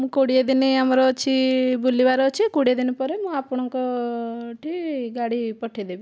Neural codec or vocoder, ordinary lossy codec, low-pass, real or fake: none; none; none; real